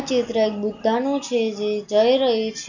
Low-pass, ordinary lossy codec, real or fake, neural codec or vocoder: 7.2 kHz; none; real; none